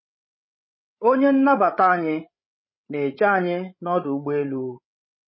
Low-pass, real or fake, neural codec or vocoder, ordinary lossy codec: 7.2 kHz; fake; codec, 16 kHz, 16 kbps, FreqCodec, larger model; MP3, 24 kbps